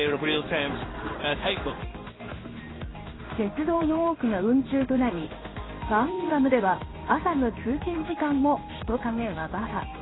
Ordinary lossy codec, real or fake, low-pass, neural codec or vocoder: AAC, 16 kbps; fake; 7.2 kHz; codec, 16 kHz in and 24 kHz out, 1 kbps, XY-Tokenizer